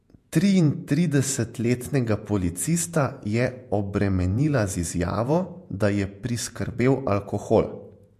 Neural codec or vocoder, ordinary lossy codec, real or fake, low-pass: vocoder, 48 kHz, 128 mel bands, Vocos; MP3, 64 kbps; fake; 14.4 kHz